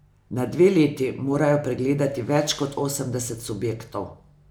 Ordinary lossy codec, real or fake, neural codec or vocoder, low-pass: none; real; none; none